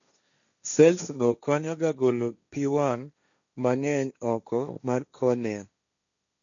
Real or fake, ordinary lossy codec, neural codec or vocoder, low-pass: fake; none; codec, 16 kHz, 1.1 kbps, Voila-Tokenizer; 7.2 kHz